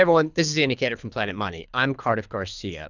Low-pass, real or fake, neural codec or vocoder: 7.2 kHz; fake; codec, 24 kHz, 3 kbps, HILCodec